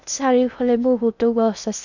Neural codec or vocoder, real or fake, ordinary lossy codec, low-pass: codec, 16 kHz in and 24 kHz out, 0.8 kbps, FocalCodec, streaming, 65536 codes; fake; none; 7.2 kHz